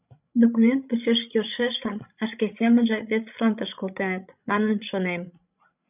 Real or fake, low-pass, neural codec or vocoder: fake; 3.6 kHz; codec, 16 kHz, 16 kbps, FreqCodec, larger model